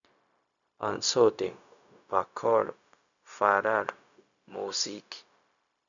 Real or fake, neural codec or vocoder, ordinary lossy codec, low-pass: fake; codec, 16 kHz, 0.4 kbps, LongCat-Audio-Codec; none; 7.2 kHz